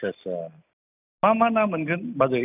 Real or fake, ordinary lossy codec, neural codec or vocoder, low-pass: real; none; none; 3.6 kHz